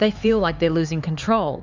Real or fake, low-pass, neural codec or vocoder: fake; 7.2 kHz; codec, 16 kHz, 4 kbps, X-Codec, HuBERT features, trained on LibriSpeech